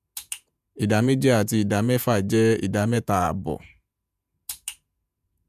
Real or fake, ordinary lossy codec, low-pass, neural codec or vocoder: fake; none; 14.4 kHz; vocoder, 48 kHz, 128 mel bands, Vocos